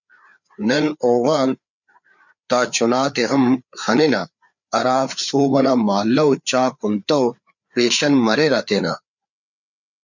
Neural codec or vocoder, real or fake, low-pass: codec, 16 kHz, 4 kbps, FreqCodec, larger model; fake; 7.2 kHz